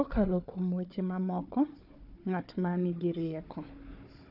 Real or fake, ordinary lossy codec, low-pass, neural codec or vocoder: fake; none; 5.4 kHz; codec, 16 kHz, 4 kbps, FunCodec, trained on Chinese and English, 50 frames a second